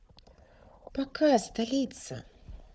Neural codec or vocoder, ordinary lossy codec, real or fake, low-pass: codec, 16 kHz, 4 kbps, FunCodec, trained on Chinese and English, 50 frames a second; none; fake; none